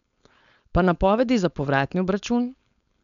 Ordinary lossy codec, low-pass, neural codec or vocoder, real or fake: none; 7.2 kHz; codec, 16 kHz, 4.8 kbps, FACodec; fake